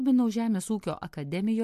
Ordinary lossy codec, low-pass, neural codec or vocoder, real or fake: MP3, 64 kbps; 14.4 kHz; none; real